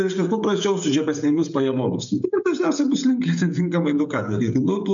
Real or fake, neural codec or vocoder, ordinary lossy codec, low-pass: fake; codec, 16 kHz, 16 kbps, FreqCodec, smaller model; MP3, 64 kbps; 7.2 kHz